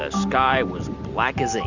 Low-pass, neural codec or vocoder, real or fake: 7.2 kHz; none; real